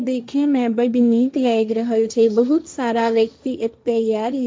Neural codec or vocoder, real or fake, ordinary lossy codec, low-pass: codec, 16 kHz, 1.1 kbps, Voila-Tokenizer; fake; none; none